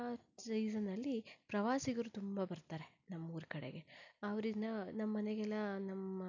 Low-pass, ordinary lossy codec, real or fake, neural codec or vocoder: 7.2 kHz; none; real; none